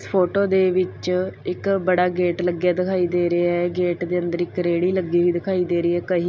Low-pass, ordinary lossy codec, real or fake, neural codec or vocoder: none; none; real; none